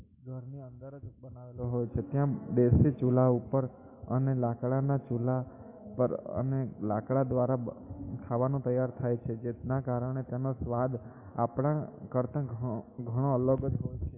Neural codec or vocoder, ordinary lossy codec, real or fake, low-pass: autoencoder, 48 kHz, 128 numbers a frame, DAC-VAE, trained on Japanese speech; MP3, 24 kbps; fake; 3.6 kHz